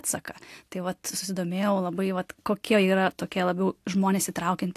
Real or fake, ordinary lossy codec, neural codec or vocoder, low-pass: real; AAC, 64 kbps; none; 14.4 kHz